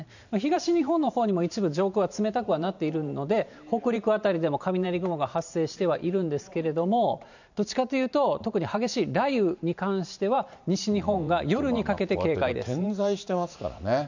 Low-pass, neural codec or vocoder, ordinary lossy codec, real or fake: 7.2 kHz; vocoder, 44.1 kHz, 128 mel bands every 512 samples, BigVGAN v2; none; fake